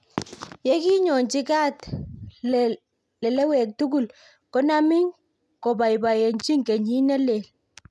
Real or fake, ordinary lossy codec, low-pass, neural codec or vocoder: real; none; none; none